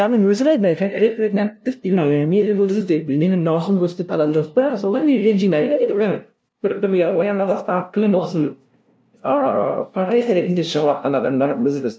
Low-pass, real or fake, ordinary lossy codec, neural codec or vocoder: none; fake; none; codec, 16 kHz, 0.5 kbps, FunCodec, trained on LibriTTS, 25 frames a second